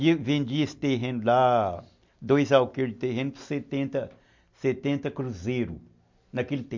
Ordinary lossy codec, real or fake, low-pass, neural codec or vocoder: MP3, 48 kbps; real; 7.2 kHz; none